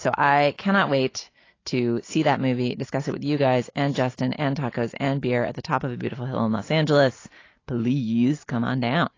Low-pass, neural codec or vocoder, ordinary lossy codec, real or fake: 7.2 kHz; none; AAC, 32 kbps; real